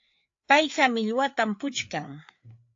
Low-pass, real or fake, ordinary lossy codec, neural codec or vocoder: 7.2 kHz; fake; AAC, 48 kbps; codec, 16 kHz, 4 kbps, FreqCodec, larger model